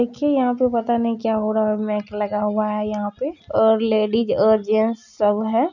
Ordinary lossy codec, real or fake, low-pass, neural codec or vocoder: none; real; 7.2 kHz; none